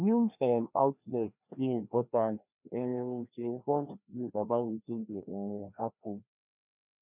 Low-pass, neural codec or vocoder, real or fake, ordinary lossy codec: 3.6 kHz; codec, 16 kHz, 1 kbps, FreqCodec, larger model; fake; none